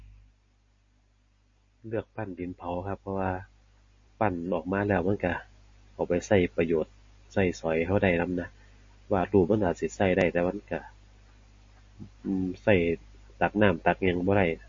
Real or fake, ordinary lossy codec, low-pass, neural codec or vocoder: real; MP3, 32 kbps; 7.2 kHz; none